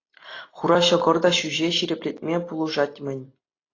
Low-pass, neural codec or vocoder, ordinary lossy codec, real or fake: 7.2 kHz; none; AAC, 32 kbps; real